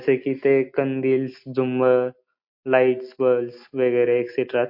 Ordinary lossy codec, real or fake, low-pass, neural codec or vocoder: MP3, 32 kbps; real; 5.4 kHz; none